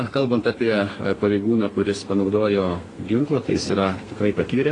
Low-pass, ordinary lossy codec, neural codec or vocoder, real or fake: 10.8 kHz; AAC, 32 kbps; codec, 32 kHz, 1.9 kbps, SNAC; fake